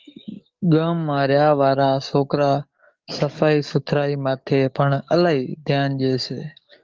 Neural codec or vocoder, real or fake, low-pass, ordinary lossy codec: codec, 44.1 kHz, 7.8 kbps, DAC; fake; 7.2 kHz; Opus, 32 kbps